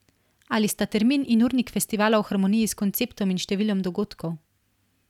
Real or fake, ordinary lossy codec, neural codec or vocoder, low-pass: real; none; none; 19.8 kHz